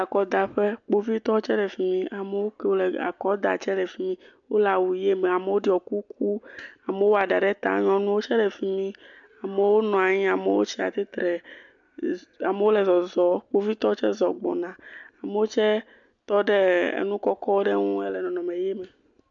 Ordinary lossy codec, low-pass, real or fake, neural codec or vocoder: MP3, 64 kbps; 7.2 kHz; real; none